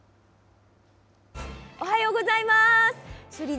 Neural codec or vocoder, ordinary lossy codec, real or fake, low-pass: none; none; real; none